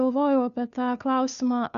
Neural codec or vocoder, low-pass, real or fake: codec, 16 kHz, 2 kbps, FunCodec, trained on Chinese and English, 25 frames a second; 7.2 kHz; fake